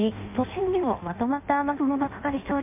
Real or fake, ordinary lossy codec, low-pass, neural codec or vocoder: fake; MP3, 32 kbps; 3.6 kHz; codec, 16 kHz in and 24 kHz out, 0.6 kbps, FireRedTTS-2 codec